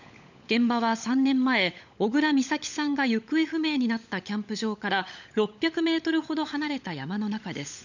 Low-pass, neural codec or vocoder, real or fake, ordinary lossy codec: 7.2 kHz; codec, 16 kHz, 16 kbps, FunCodec, trained on LibriTTS, 50 frames a second; fake; none